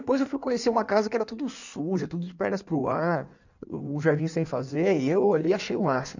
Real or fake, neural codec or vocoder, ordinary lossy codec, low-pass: fake; codec, 16 kHz in and 24 kHz out, 1.1 kbps, FireRedTTS-2 codec; none; 7.2 kHz